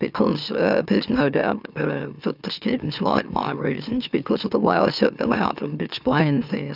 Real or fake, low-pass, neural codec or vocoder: fake; 5.4 kHz; autoencoder, 44.1 kHz, a latent of 192 numbers a frame, MeloTTS